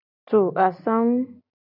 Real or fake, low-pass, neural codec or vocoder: real; 5.4 kHz; none